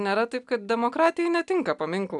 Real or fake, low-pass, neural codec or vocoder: real; 10.8 kHz; none